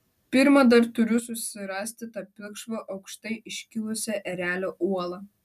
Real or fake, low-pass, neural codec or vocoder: real; 14.4 kHz; none